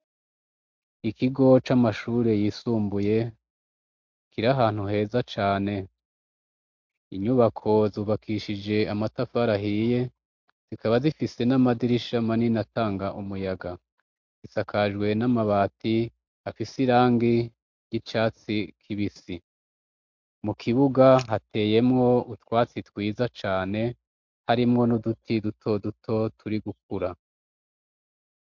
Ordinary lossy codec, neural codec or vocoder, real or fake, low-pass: MP3, 64 kbps; none; real; 7.2 kHz